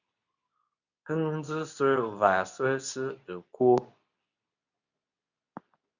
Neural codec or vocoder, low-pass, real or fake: codec, 24 kHz, 0.9 kbps, WavTokenizer, medium speech release version 2; 7.2 kHz; fake